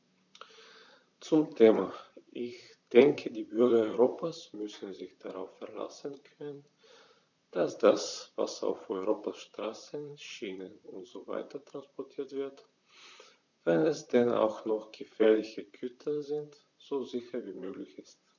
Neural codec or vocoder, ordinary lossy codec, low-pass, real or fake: vocoder, 44.1 kHz, 128 mel bands, Pupu-Vocoder; none; 7.2 kHz; fake